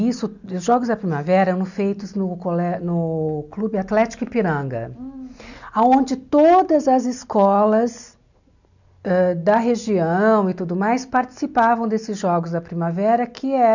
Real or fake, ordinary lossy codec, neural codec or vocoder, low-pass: real; none; none; 7.2 kHz